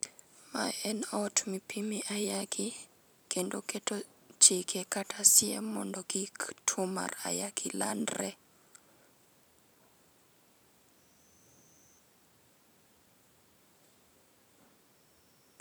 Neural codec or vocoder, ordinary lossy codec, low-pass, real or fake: vocoder, 44.1 kHz, 128 mel bands every 512 samples, BigVGAN v2; none; none; fake